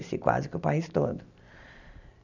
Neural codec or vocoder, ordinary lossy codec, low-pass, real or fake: none; none; 7.2 kHz; real